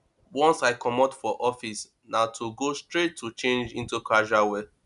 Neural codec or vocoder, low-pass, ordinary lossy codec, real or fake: none; 10.8 kHz; none; real